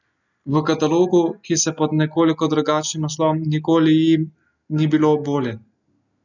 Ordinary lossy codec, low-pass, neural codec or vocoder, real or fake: none; 7.2 kHz; none; real